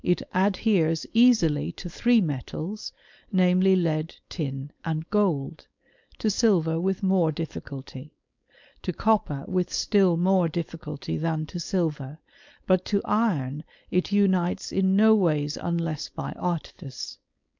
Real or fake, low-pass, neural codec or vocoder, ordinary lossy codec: fake; 7.2 kHz; codec, 16 kHz, 4.8 kbps, FACodec; MP3, 64 kbps